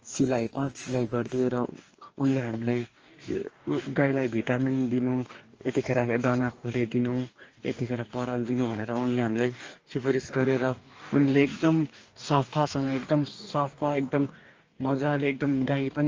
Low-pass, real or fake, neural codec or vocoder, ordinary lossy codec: 7.2 kHz; fake; codec, 44.1 kHz, 2.6 kbps, DAC; Opus, 24 kbps